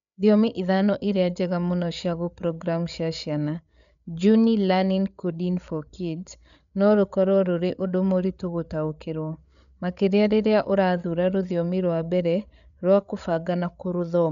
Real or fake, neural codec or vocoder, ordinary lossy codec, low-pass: fake; codec, 16 kHz, 8 kbps, FreqCodec, larger model; none; 7.2 kHz